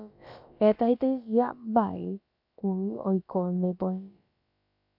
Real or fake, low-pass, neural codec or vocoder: fake; 5.4 kHz; codec, 16 kHz, about 1 kbps, DyCAST, with the encoder's durations